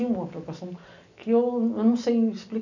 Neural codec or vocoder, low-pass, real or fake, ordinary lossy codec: none; 7.2 kHz; real; none